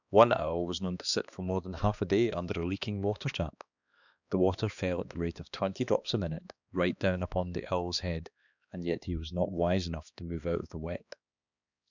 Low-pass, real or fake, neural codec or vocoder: 7.2 kHz; fake; codec, 16 kHz, 2 kbps, X-Codec, HuBERT features, trained on balanced general audio